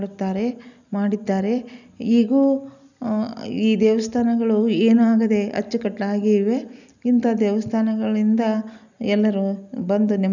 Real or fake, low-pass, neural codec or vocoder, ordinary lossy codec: real; 7.2 kHz; none; none